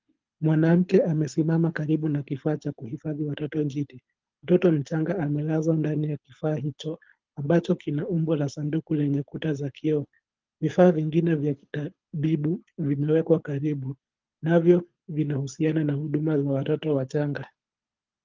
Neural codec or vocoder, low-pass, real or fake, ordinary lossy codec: codec, 24 kHz, 6 kbps, HILCodec; 7.2 kHz; fake; Opus, 32 kbps